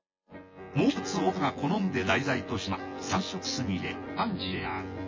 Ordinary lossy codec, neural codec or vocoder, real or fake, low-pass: MP3, 32 kbps; vocoder, 24 kHz, 100 mel bands, Vocos; fake; 7.2 kHz